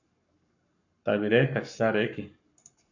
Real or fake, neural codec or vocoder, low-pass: fake; codec, 44.1 kHz, 7.8 kbps, Pupu-Codec; 7.2 kHz